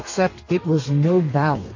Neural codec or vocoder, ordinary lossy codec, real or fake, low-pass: codec, 32 kHz, 1.9 kbps, SNAC; MP3, 32 kbps; fake; 7.2 kHz